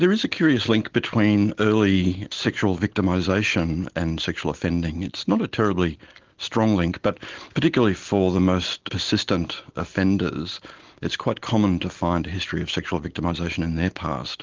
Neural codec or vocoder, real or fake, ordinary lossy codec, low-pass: none; real; Opus, 16 kbps; 7.2 kHz